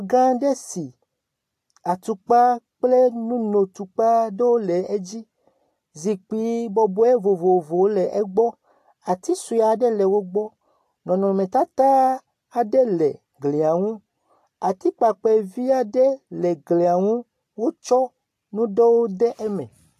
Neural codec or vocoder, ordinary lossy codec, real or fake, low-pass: none; AAC, 64 kbps; real; 14.4 kHz